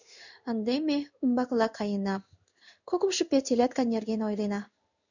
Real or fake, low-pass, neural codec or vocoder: fake; 7.2 kHz; codec, 16 kHz in and 24 kHz out, 1 kbps, XY-Tokenizer